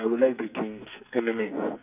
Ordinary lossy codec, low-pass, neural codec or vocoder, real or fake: none; 3.6 kHz; codec, 44.1 kHz, 2.6 kbps, SNAC; fake